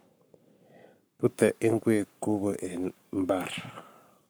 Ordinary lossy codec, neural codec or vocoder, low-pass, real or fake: none; codec, 44.1 kHz, 7.8 kbps, Pupu-Codec; none; fake